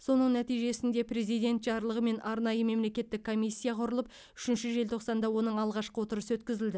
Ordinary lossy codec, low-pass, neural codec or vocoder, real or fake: none; none; none; real